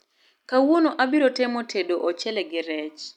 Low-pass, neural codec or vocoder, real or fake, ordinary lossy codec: 19.8 kHz; none; real; none